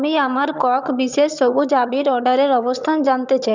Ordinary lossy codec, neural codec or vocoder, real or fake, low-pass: none; vocoder, 22.05 kHz, 80 mel bands, HiFi-GAN; fake; 7.2 kHz